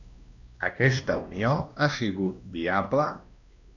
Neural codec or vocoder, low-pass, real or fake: codec, 16 kHz, 1 kbps, X-Codec, WavLM features, trained on Multilingual LibriSpeech; 7.2 kHz; fake